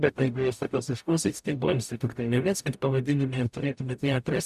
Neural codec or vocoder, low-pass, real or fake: codec, 44.1 kHz, 0.9 kbps, DAC; 14.4 kHz; fake